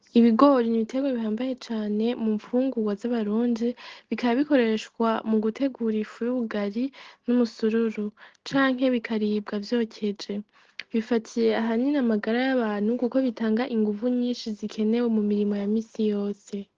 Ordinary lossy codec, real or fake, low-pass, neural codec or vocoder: Opus, 16 kbps; real; 7.2 kHz; none